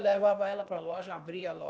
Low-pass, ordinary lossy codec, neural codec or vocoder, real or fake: none; none; codec, 16 kHz, 0.8 kbps, ZipCodec; fake